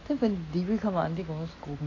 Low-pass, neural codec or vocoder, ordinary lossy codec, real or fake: 7.2 kHz; none; AAC, 32 kbps; real